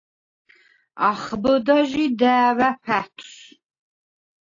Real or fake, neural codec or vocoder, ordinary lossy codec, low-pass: real; none; AAC, 32 kbps; 7.2 kHz